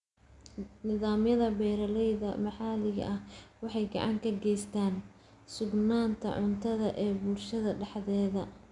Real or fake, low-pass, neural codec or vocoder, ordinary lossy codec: real; 10.8 kHz; none; none